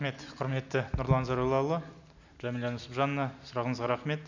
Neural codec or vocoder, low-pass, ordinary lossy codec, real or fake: none; 7.2 kHz; none; real